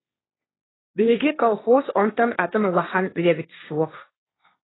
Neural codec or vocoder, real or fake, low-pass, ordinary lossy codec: codec, 16 kHz, 1.1 kbps, Voila-Tokenizer; fake; 7.2 kHz; AAC, 16 kbps